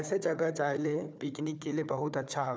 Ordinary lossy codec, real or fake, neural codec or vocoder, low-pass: none; fake; codec, 16 kHz, 4 kbps, FunCodec, trained on Chinese and English, 50 frames a second; none